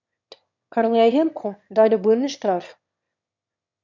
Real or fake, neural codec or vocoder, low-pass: fake; autoencoder, 22.05 kHz, a latent of 192 numbers a frame, VITS, trained on one speaker; 7.2 kHz